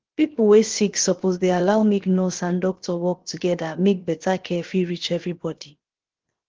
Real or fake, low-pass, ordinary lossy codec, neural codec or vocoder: fake; 7.2 kHz; Opus, 16 kbps; codec, 16 kHz, 0.7 kbps, FocalCodec